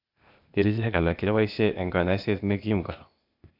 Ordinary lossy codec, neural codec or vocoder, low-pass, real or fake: AAC, 48 kbps; codec, 16 kHz, 0.8 kbps, ZipCodec; 5.4 kHz; fake